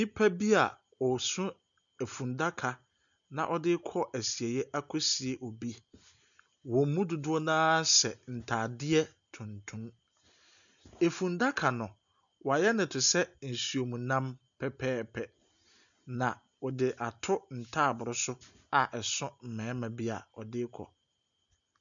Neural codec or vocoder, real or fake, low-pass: none; real; 7.2 kHz